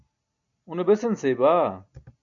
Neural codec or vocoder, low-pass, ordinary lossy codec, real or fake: none; 7.2 kHz; MP3, 64 kbps; real